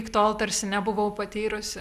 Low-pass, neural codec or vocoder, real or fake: 14.4 kHz; none; real